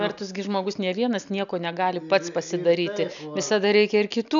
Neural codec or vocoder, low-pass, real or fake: none; 7.2 kHz; real